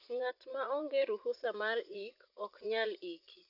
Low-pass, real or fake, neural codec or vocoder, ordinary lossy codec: 5.4 kHz; fake; vocoder, 44.1 kHz, 80 mel bands, Vocos; none